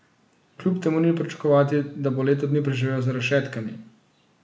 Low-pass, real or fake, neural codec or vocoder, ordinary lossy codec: none; real; none; none